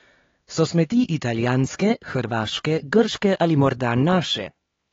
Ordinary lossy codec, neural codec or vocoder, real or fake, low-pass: AAC, 24 kbps; codec, 16 kHz, 4 kbps, X-Codec, HuBERT features, trained on LibriSpeech; fake; 7.2 kHz